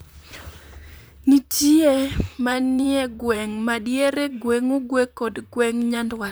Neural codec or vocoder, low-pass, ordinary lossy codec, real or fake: vocoder, 44.1 kHz, 128 mel bands, Pupu-Vocoder; none; none; fake